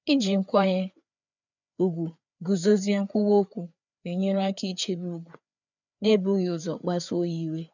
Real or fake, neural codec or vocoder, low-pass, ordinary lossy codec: fake; codec, 16 kHz, 4 kbps, FreqCodec, larger model; 7.2 kHz; none